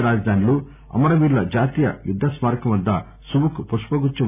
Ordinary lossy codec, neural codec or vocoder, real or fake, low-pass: none; none; real; 3.6 kHz